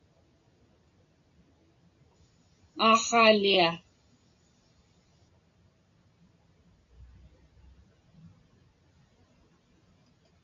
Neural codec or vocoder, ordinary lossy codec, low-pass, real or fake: none; AAC, 64 kbps; 7.2 kHz; real